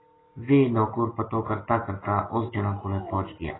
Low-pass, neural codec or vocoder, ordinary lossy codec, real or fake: 7.2 kHz; none; AAC, 16 kbps; real